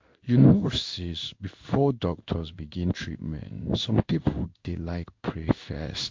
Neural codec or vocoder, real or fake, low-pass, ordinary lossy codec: codec, 16 kHz in and 24 kHz out, 1 kbps, XY-Tokenizer; fake; 7.2 kHz; MP3, 48 kbps